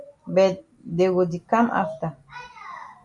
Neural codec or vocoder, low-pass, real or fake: none; 10.8 kHz; real